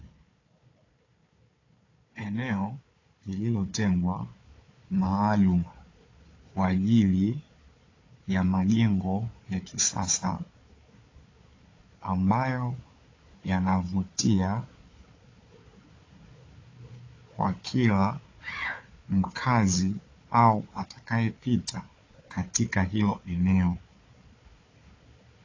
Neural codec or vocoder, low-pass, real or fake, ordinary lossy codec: codec, 16 kHz, 4 kbps, FunCodec, trained on Chinese and English, 50 frames a second; 7.2 kHz; fake; AAC, 32 kbps